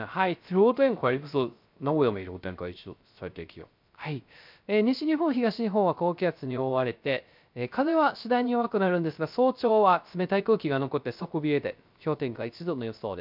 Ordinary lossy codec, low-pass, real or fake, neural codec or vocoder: MP3, 48 kbps; 5.4 kHz; fake; codec, 16 kHz, 0.3 kbps, FocalCodec